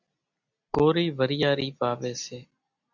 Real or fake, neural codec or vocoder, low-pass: real; none; 7.2 kHz